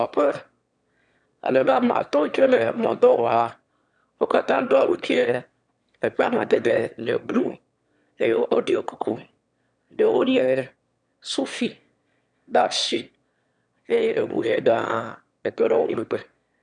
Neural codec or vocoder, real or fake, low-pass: autoencoder, 22.05 kHz, a latent of 192 numbers a frame, VITS, trained on one speaker; fake; 9.9 kHz